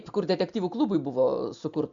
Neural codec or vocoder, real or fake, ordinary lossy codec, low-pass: none; real; MP3, 64 kbps; 7.2 kHz